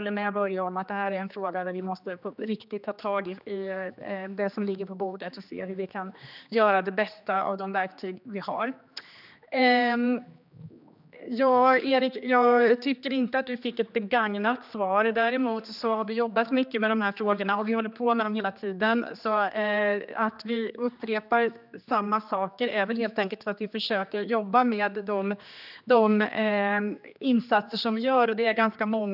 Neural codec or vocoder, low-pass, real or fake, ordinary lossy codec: codec, 16 kHz, 2 kbps, X-Codec, HuBERT features, trained on general audio; 5.4 kHz; fake; none